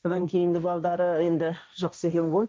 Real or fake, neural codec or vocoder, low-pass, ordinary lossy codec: fake; codec, 16 kHz, 1.1 kbps, Voila-Tokenizer; none; none